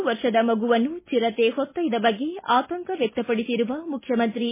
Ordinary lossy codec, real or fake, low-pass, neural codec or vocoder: MP3, 16 kbps; real; 3.6 kHz; none